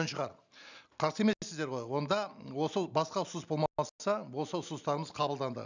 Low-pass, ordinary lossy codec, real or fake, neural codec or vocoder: 7.2 kHz; none; real; none